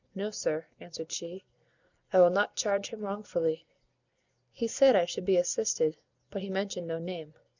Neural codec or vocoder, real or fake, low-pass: vocoder, 44.1 kHz, 128 mel bands every 256 samples, BigVGAN v2; fake; 7.2 kHz